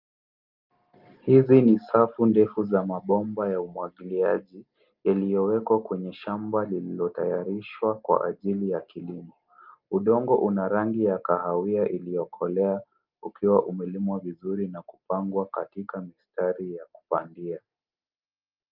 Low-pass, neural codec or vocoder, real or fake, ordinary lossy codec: 5.4 kHz; none; real; Opus, 24 kbps